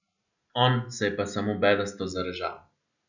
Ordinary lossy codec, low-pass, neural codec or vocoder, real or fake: none; 7.2 kHz; none; real